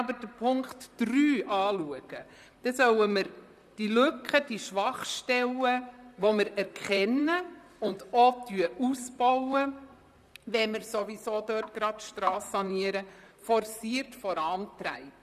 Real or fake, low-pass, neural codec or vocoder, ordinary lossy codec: fake; 14.4 kHz; vocoder, 44.1 kHz, 128 mel bands, Pupu-Vocoder; none